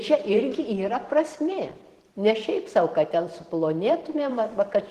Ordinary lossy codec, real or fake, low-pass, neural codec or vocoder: Opus, 16 kbps; fake; 14.4 kHz; vocoder, 44.1 kHz, 128 mel bands every 512 samples, BigVGAN v2